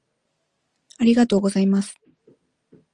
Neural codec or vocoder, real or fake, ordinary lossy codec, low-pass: none; real; Opus, 32 kbps; 9.9 kHz